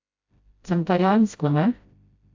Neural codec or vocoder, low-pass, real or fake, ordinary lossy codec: codec, 16 kHz, 0.5 kbps, FreqCodec, smaller model; 7.2 kHz; fake; AAC, 48 kbps